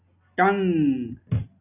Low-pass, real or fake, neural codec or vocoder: 3.6 kHz; real; none